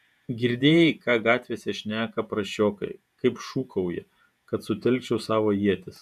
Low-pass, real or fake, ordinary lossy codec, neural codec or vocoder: 14.4 kHz; fake; MP3, 96 kbps; vocoder, 48 kHz, 128 mel bands, Vocos